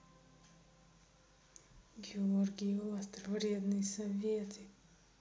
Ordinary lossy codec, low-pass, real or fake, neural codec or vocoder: none; none; real; none